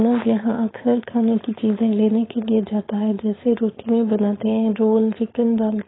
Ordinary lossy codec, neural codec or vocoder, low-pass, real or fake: AAC, 16 kbps; codec, 16 kHz, 4.8 kbps, FACodec; 7.2 kHz; fake